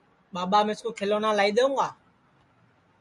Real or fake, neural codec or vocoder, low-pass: real; none; 10.8 kHz